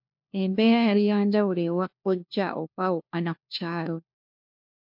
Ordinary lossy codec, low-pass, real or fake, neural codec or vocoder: MP3, 48 kbps; 5.4 kHz; fake; codec, 16 kHz, 1 kbps, FunCodec, trained on LibriTTS, 50 frames a second